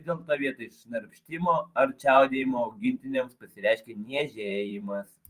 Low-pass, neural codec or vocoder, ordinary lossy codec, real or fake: 14.4 kHz; vocoder, 44.1 kHz, 128 mel bands every 512 samples, BigVGAN v2; Opus, 32 kbps; fake